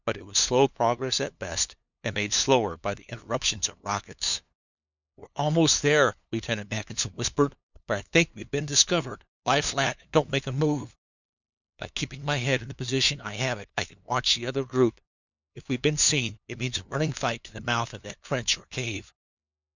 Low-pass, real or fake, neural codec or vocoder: 7.2 kHz; fake; codec, 16 kHz, 2 kbps, FunCodec, trained on LibriTTS, 25 frames a second